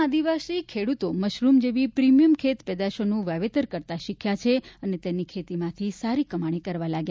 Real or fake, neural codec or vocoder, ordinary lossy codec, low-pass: real; none; none; none